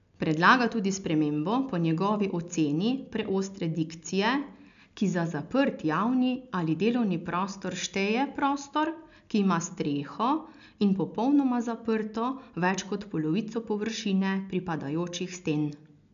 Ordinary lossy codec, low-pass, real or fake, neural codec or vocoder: MP3, 96 kbps; 7.2 kHz; real; none